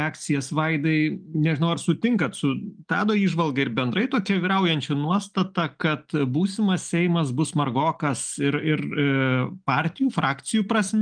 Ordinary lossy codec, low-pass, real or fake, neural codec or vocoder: Opus, 32 kbps; 9.9 kHz; real; none